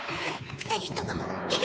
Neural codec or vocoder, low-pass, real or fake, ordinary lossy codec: codec, 16 kHz, 4 kbps, X-Codec, WavLM features, trained on Multilingual LibriSpeech; none; fake; none